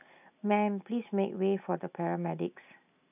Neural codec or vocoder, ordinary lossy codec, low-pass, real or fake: none; none; 3.6 kHz; real